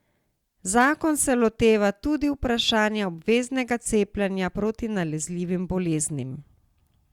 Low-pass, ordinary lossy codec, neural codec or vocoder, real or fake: 19.8 kHz; Opus, 64 kbps; none; real